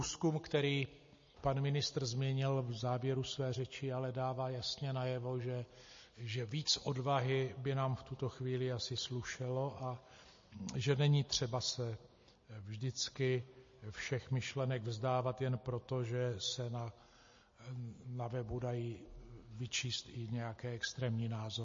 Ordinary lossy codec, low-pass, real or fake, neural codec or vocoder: MP3, 32 kbps; 7.2 kHz; real; none